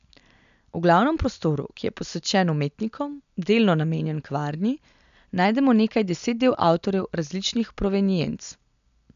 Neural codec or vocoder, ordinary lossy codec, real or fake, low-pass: none; MP3, 96 kbps; real; 7.2 kHz